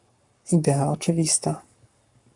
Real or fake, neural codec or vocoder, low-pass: fake; codec, 44.1 kHz, 7.8 kbps, Pupu-Codec; 10.8 kHz